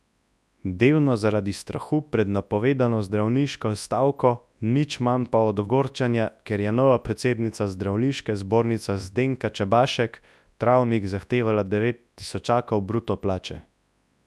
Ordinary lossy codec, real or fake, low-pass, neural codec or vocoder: none; fake; none; codec, 24 kHz, 0.9 kbps, WavTokenizer, large speech release